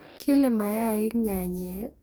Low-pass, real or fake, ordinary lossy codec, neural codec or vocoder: none; fake; none; codec, 44.1 kHz, 2.6 kbps, DAC